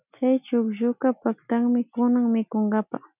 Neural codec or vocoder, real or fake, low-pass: none; real; 3.6 kHz